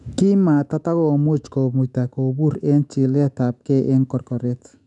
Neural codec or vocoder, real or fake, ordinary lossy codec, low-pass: autoencoder, 48 kHz, 128 numbers a frame, DAC-VAE, trained on Japanese speech; fake; none; 10.8 kHz